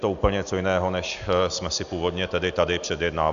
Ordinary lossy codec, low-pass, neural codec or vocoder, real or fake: AAC, 96 kbps; 7.2 kHz; none; real